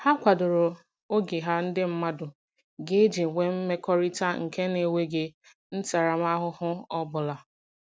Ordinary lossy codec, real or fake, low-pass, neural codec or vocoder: none; real; none; none